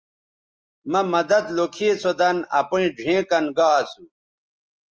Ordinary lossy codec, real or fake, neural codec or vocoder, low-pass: Opus, 24 kbps; real; none; 7.2 kHz